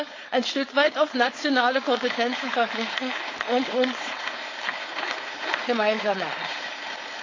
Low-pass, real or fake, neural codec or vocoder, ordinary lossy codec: 7.2 kHz; fake; codec, 16 kHz, 4.8 kbps, FACodec; AAC, 32 kbps